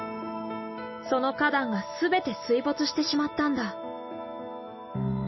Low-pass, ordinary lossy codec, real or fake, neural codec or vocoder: 7.2 kHz; MP3, 24 kbps; real; none